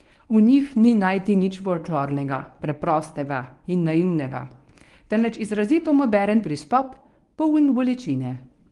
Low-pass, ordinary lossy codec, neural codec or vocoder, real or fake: 10.8 kHz; Opus, 24 kbps; codec, 24 kHz, 0.9 kbps, WavTokenizer, medium speech release version 1; fake